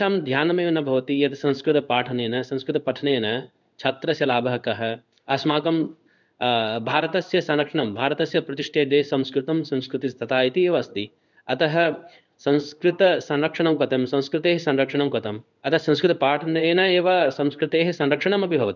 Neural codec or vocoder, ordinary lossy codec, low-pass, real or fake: codec, 16 kHz in and 24 kHz out, 1 kbps, XY-Tokenizer; none; 7.2 kHz; fake